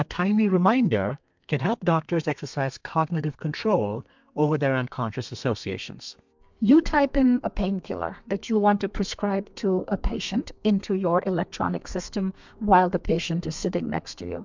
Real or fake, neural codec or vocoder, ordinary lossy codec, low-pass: fake; codec, 32 kHz, 1.9 kbps, SNAC; MP3, 64 kbps; 7.2 kHz